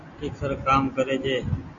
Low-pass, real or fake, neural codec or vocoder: 7.2 kHz; real; none